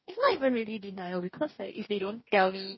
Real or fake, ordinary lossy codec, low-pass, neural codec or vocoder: fake; MP3, 24 kbps; 7.2 kHz; codec, 44.1 kHz, 2.6 kbps, DAC